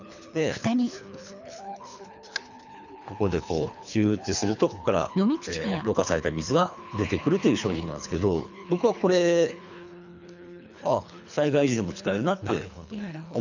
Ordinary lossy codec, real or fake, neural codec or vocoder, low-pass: none; fake; codec, 24 kHz, 3 kbps, HILCodec; 7.2 kHz